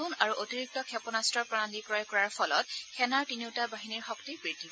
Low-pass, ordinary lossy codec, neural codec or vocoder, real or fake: none; none; none; real